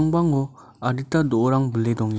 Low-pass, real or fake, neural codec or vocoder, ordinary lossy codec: none; real; none; none